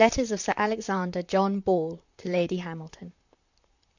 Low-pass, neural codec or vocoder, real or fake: 7.2 kHz; vocoder, 44.1 kHz, 128 mel bands, Pupu-Vocoder; fake